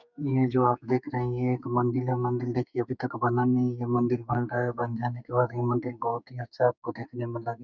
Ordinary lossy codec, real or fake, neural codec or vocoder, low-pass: none; fake; codec, 44.1 kHz, 2.6 kbps, SNAC; 7.2 kHz